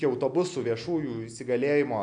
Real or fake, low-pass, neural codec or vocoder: real; 9.9 kHz; none